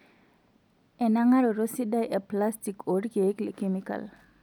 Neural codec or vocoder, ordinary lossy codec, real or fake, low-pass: none; none; real; 19.8 kHz